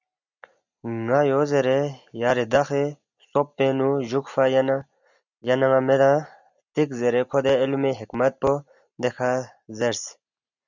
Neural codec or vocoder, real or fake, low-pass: none; real; 7.2 kHz